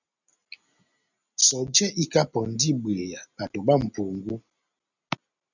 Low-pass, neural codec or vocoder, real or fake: 7.2 kHz; none; real